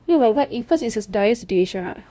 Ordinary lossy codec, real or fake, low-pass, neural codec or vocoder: none; fake; none; codec, 16 kHz, 0.5 kbps, FunCodec, trained on LibriTTS, 25 frames a second